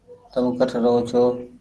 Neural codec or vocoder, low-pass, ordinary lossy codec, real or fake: none; 10.8 kHz; Opus, 16 kbps; real